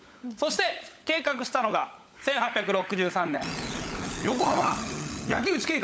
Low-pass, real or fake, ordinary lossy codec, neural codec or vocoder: none; fake; none; codec, 16 kHz, 16 kbps, FunCodec, trained on LibriTTS, 50 frames a second